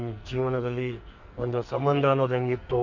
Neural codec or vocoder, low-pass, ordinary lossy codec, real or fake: codec, 44.1 kHz, 2.6 kbps, SNAC; 7.2 kHz; MP3, 64 kbps; fake